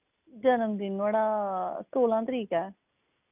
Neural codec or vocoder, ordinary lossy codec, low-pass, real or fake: none; none; 3.6 kHz; real